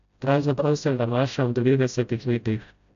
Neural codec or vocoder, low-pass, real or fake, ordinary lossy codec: codec, 16 kHz, 0.5 kbps, FreqCodec, smaller model; 7.2 kHz; fake; none